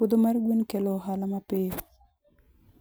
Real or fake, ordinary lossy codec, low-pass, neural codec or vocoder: real; none; none; none